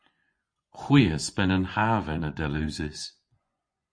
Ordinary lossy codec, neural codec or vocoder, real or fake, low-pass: MP3, 48 kbps; vocoder, 24 kHz, 100 mel bands, Vocos; fake; 9.9 kHz